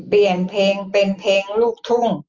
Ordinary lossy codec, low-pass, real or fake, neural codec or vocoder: Opus, 32 kbps; 7.2 kHz; real; none